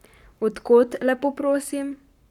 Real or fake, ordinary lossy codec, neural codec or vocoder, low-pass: fake; none; codec, 44.1 kHz, 7.8 kbps, Pupu-Codec; 19.8 kHz